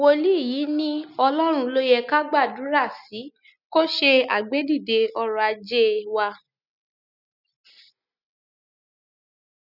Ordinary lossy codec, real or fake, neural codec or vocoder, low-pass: none; real; none; 5.4 kHz